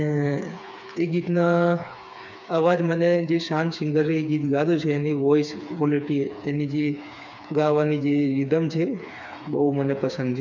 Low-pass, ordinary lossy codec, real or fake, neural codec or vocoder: 7.2 kHz; none; fake; codec, 16 kHz, 4 kbps, FreqCodec, smaller model